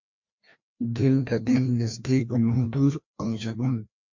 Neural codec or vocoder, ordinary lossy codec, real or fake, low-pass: codec, 16 kHz, 1 kbps, FreqCodec, larger model; MP3, 48 kbps; fake; 7.2 kHz